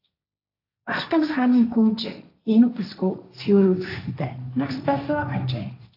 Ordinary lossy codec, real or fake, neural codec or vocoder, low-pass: AAC, 32 kbps; fake; codec, 16 kHz, 1.1 kbps, Voila-Tokenizer; 5.4 kHz